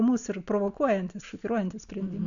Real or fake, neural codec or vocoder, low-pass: real; none; 7.2 kHz